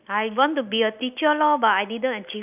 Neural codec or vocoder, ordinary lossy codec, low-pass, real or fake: none; none; 3.6 kHz; real